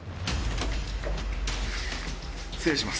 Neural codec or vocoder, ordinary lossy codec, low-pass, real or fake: none; none; none; real